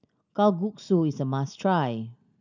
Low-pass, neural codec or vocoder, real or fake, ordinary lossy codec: 7.2 kHz; none; real; none